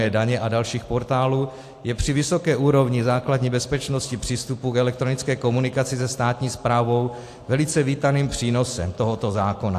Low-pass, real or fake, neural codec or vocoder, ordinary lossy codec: 14.4 kHz; real; none; AAC, 64 kbps